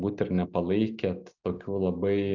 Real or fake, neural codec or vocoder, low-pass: real; none; 7.2 kHz